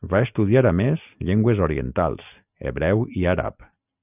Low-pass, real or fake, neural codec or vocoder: 3.6 kHz; real; none